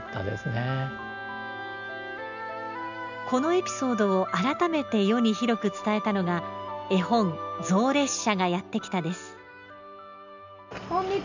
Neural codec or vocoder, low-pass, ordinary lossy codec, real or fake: none; 7.2 kHz; none; real